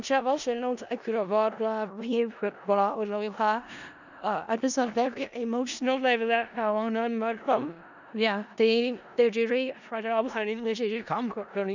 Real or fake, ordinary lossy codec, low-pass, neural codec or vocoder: fake; none; 7.2 kHz; codec, 16 kHz in and 24 kHz out, 0.4 kbps, LongCat-Audio-Codec, four codebook decoder